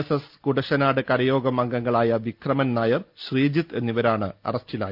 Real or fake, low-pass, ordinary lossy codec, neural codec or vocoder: real; 5.4 kHz; Opus, 32 kbps; none